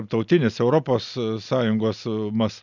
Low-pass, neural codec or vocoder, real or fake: 7.2 kHz; none; real